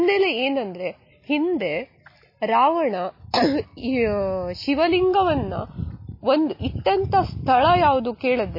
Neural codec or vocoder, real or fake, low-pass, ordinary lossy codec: none; real; 5.4 kHz; MP3, 24 kbps